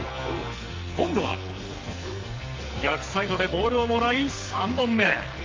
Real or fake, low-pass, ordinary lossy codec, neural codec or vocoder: fake; 7.2 kHz; Opus, 32 kbps; codec, 44.1 kHz, 2.6 kbps, SNAC